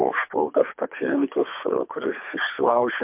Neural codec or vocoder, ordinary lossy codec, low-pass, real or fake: codec, 24 kHz, 3 kbps, HILCodec; MP3, 32 kbps; 3.6 kHz; fake